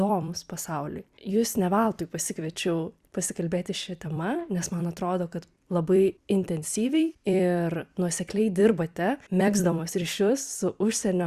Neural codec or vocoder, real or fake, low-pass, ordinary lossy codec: vocoder, 44.1 kHz, 128 mel bands every 256 samples, BigVGAN v2; fake; 14.4 kHz; Opus, 64 kbps